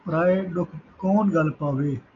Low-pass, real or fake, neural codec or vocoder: 7.2 kHz; real; none